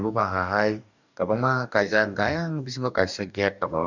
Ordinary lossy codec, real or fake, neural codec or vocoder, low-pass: none; fake; codec, 44.1 kHz, 2.6 kbps, DAC; 7.2 kHz